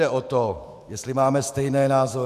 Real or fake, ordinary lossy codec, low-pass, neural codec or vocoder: fake; AAC, 96 kbps; 14.4 kHz; autoencoder, 48 kHz, 128 numbers a frame, DAC-VAE, trained on Japanese speech